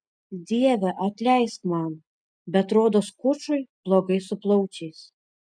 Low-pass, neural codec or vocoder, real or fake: 9.9 kHz; none; real